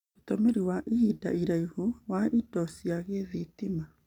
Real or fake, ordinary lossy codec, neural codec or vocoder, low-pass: real; none; none; 19.8 kHz